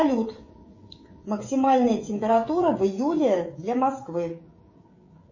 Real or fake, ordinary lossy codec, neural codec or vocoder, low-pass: fake; MP3, 32 kbps; codec, 16 kHz, 16 kbps, FreqCodec, smaller model; 7.2 kHz